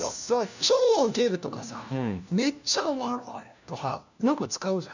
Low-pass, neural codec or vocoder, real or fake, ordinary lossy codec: 7.2 kHz; codec, 16 kHz, 1 kbps, FunCodec, trained on LibriTTS, 50 frames a second; fake; none